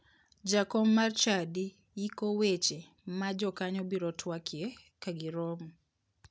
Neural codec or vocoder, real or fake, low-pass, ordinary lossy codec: none; real; none; none